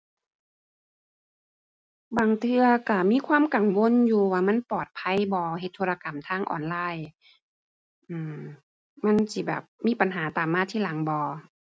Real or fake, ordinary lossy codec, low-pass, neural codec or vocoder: real; none; none; none